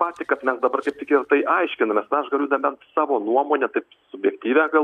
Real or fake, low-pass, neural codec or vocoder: real; 14.4 kHz; none